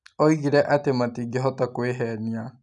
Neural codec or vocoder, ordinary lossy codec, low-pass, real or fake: none; none; 10.8 kHz; real